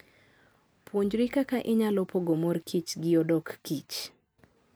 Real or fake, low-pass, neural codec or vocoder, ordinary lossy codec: real; none; none; none